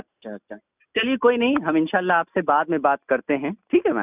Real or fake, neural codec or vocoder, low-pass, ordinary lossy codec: real; none; 3.6 kHz; none